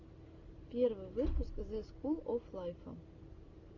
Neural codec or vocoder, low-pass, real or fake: none; 7.2 kHz; real